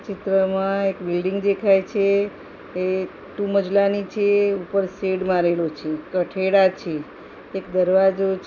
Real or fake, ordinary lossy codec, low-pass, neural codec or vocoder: real; none; 7.2 kHz; none